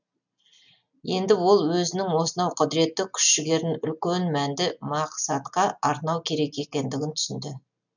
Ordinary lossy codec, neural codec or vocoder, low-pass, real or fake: none; none; 7.2 kHz; real